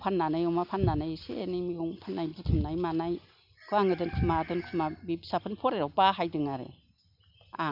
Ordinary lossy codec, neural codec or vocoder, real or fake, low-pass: none; none; real; 5.4 kHz